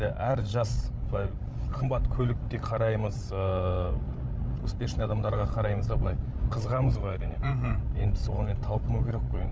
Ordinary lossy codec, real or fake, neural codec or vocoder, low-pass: none; fake; codec, 16 kHz, 16 kbps, FreqCodec, larger model; none